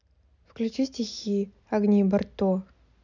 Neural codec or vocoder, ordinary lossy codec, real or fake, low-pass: none; none; real; 7.2 kHz